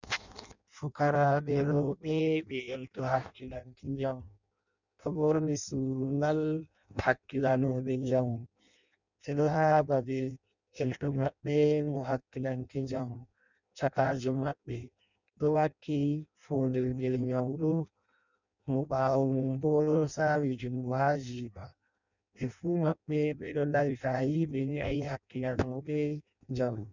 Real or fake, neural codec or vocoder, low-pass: fake; codec, 16 kHz in and 24 kHz out, 0.6 kbps, FireRedTTS-2 codec; 7.2 kHz